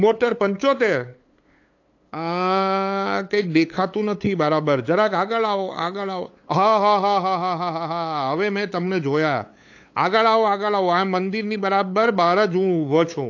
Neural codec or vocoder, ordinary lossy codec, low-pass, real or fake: codec, 16 kHz, 8 kbps, FunCodec, trained on LibriTTS, 25 frames a second; AAC, 48 kbps; 7.2 kHz; fake